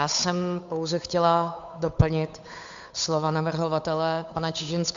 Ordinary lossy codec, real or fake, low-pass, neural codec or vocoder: AAC, 64 kbps; fake; 7.2 kHz; codec, 16 kHz, 8 kbps, FunCodec, trained on Chinese and English, 25 frames a second